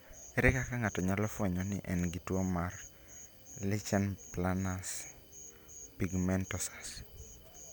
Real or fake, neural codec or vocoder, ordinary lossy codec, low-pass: real; none; none; none